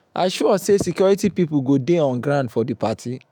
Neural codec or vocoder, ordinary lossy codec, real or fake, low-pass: autoencoder, 48 kHz, 128 numbers a frame, DAC-VAE, trained on Japanese speech; none; fake; 19.8 kHz